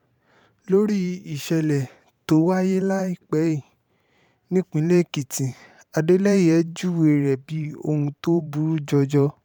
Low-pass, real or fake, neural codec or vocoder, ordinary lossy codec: 19.8 kHz; fake; vocoder, 44.1 kHz, 128 mel bands every 512 samples, BigVGAN v2; none